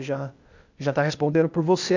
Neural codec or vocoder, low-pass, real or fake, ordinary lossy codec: codec, 16 kHz, 0.8 kbps, ZipCodec; 7.2 kHz; fake; none